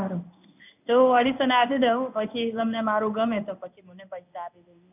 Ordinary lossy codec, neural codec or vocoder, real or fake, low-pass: none; codec, 16 kHz in and 24 kHz out, 1 kbps, XY-Tokenizer; fake; 3.6 kHz